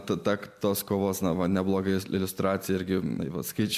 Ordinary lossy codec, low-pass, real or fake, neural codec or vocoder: MP3, 96 kbps; 14.4 kHz; real; none